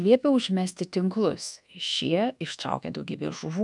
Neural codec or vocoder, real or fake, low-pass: codec, 24 kHz, 1.2 kbps, DualCodec; fake; 10.8 kHz